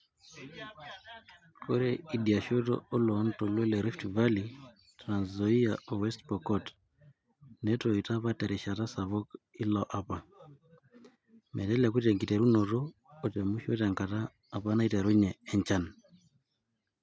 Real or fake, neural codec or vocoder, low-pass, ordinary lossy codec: real; none; none; none